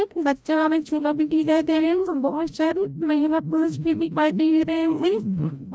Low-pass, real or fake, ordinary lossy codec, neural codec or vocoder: none; fake; none; codec, 16 kHz, 0.5 kbps, FreqCodec, larger model